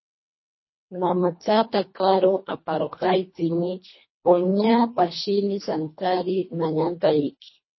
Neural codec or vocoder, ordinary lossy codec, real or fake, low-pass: codec, 24 kHz, 1.5 kbps, HILCodec; MP3, 24 kbps; fake; 7.2 kHz